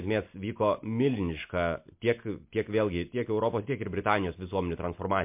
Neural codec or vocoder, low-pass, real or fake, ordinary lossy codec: none; 3.6 kHz; real; MP3, 32 kbps